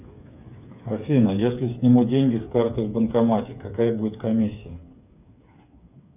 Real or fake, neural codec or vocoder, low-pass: fake; codec, 16 kHz, 8 kbps, FreqCodec, smaller model; 3.6 kHz